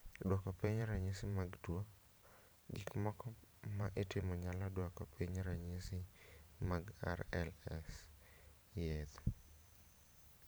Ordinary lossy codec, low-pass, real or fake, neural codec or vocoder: none; none; fake; vocoder, 44.1 kHz, 128 mel bands every 512 samples, BigVGAN v2